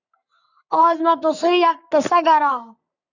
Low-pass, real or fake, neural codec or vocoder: 7.2 kHz; fake; codec, 44.1 kHz, 3.4 kbps, Pupu-Codec